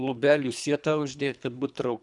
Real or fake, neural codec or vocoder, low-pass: fake; codec, 24 kHz, 3 kbps, HILCodec; 10.8 kHz